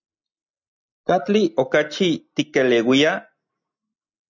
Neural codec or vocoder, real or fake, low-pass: none; real; 7.2 kHz